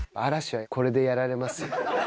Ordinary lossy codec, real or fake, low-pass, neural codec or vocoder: none; real; none; none